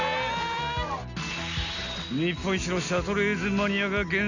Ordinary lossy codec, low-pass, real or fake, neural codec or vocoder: none; 7.2 kHz; real; none